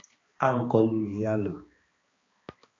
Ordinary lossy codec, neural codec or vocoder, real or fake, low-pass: AAC, 32 kbps; codec, 16 kHz, 2 kbps, X-Codec, HuBERT features, trained on balanced general audio; fake; 7.2 kHz